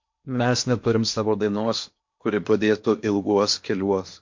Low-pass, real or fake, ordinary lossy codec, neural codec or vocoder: 7.2 kHz; fake; MP3, 48 kbps; codec, 16 kHz in and 24 kHz out, 0.8 kbps, FocalCodec, streaming, 65536 codes